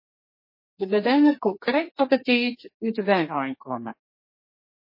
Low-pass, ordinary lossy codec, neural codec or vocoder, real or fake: 5.4 kHz; MP3, 24 kbps; codec, 32 kHz, 1.9 kbps, SNAC; fake